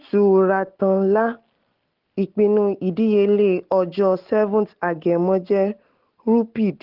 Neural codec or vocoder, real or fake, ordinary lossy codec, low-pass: none; real; Opus, 16 kbps; 5.4 kHz